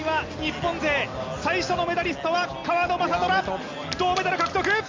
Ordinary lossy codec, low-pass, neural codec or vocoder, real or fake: Opus, 32 kbps; 7.2 kHz; none; real